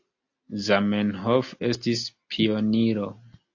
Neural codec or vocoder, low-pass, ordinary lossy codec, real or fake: none; 7.2 kHz; AAC, 48 kbps; real